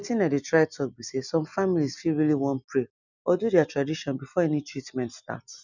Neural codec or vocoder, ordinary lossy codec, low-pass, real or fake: none; none; 7.2 kHz; real